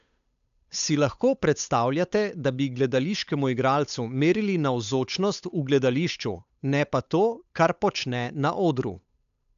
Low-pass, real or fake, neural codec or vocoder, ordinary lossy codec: 7.2 kHz; fake; codec, 16 kHz, 8 kbps, FunCodec, trained on Chinese and English, 25 frames a second; none